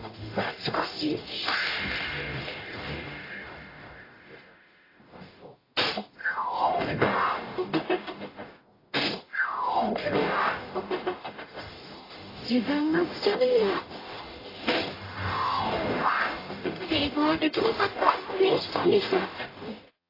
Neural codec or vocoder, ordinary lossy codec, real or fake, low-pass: codec, 44.1 kHz, 0.9 kbps, DAC; AAC, 24 kbps; fake; 5.4 kHz